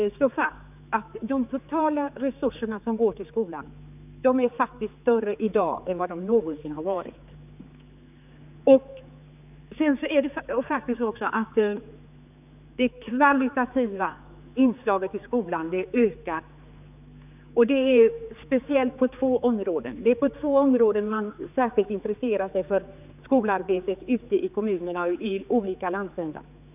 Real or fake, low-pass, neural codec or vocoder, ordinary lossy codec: fake; 3.6 kHz; codec, 16 kHz, 4 kbps, X-Codec, HuBERT features, trained on general audio; none